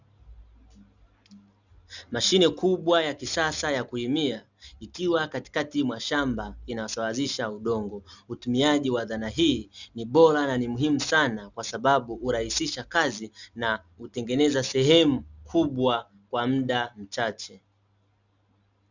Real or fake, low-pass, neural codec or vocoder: real; 7.2 kHz; none